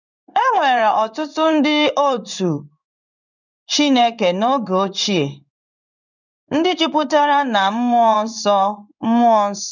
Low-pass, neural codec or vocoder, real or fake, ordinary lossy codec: 7.2 kHz; codec, 16 kHz in and 24 kHz out, 1 kbps, XY-Tokenizer; fake; none